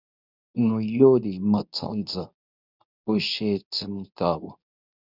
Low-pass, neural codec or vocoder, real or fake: 5.4 kHz; codec, 24 kHz, 0.9 kbps, WavTokenizer, medium speech release version 2; fake